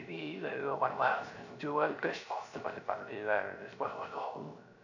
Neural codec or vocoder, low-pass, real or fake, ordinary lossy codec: codec, 16 kHz, 0.3 kbps, FocalCodec; 7.2 kHz; fake; none